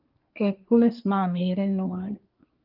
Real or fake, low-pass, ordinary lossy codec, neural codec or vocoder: fake; 5.4 kHz; Opus, 24 kbps; codec, 24 kHz, 1 kbps, SNAC